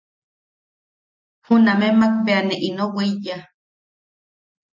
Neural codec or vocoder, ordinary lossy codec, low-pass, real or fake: none; MP3, 48 kbps; 7.2 kHz; real